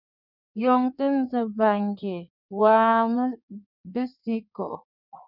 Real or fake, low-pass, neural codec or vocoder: fake; 5.4 kHz; codec, 16 kHz in and 24 kHz out, 1.1 kbps, FireRedTTS-2 codec